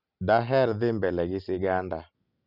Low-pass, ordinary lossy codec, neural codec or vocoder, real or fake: 5.4 kHz; none; none; real